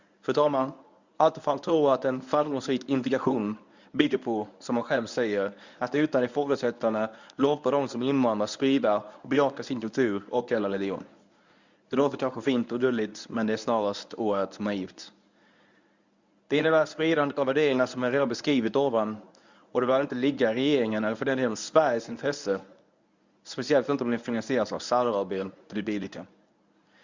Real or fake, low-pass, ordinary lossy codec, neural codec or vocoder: fake; 7.2 kHz; Opus, 64 kbps; codec, 24 kHz, 0.9 kbps, WavTokenizer, medium speech release version 1